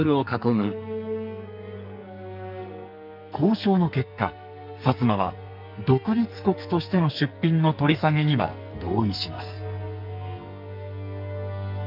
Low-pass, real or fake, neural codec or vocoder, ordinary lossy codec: 5.4 kHz; fake; codec, 44.1 kHz, 2.6 kbps, SNAC; none